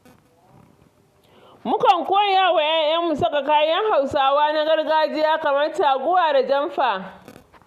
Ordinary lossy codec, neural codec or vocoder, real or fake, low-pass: Opus, 64 kbps; none; real; 14.4 kHz